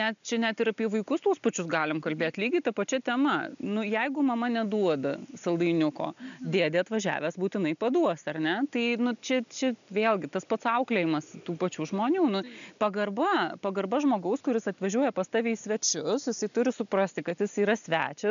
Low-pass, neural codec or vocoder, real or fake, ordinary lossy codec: 7.2 kHz; none; real; MP3, 96 kbps